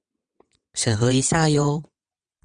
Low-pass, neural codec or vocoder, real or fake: 9.9 kHz; vocoder, 22.05 kHz, 80 mel bands, WaveNeXt; fake